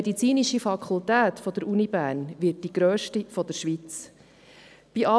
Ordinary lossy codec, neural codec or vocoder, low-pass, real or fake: none; none; none; real